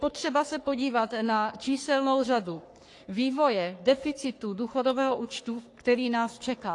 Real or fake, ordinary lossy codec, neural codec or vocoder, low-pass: fake; AAC, 48 kbps; codec, 44.1 kHz, 3.4 kbps, Pupu-Codec; 10.8 kHz